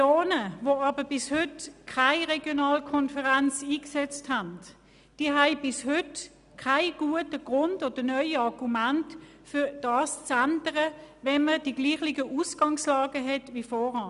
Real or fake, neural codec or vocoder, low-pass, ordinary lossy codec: real; none; 10.8 kHz; none